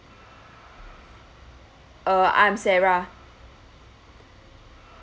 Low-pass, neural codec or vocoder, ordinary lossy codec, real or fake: none; none; none; real